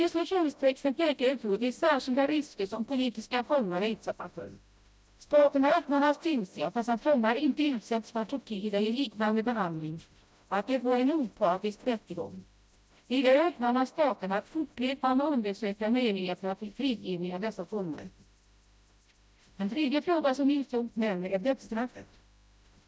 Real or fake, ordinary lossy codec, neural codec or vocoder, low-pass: fake; none; codec, 16 kHz, 0.5 kbps, FreqCodec, smaller model; none